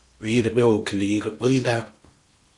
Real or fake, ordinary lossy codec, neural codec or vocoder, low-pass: fake; Opus, 64 kbps; codec, 16 kHz in and 24 kHz out, 0.8 kbps, FocalCodec, streaming, 65536 codes; 10.8 kHz